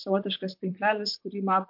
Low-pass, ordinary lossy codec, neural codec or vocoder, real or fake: 5.4 kHz; MP3, 48 kbps; vocoder, 44.1 kHz, 128 mel bands, Pupu-Vocoder; fake